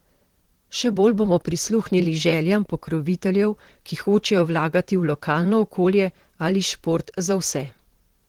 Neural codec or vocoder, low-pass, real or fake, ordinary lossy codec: vocoder, 44.1 kHz, 128 mel bands, Pupu-Vocoder; 19.8 kHz; fake; Opus, 16 kbps